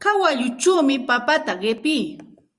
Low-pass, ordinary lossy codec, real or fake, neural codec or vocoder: 10.8 kHz; Opus, 64 kbps; fake; vocoder, 44.1 kHz, 128 mel bands every 512 samples, BigVGAN v2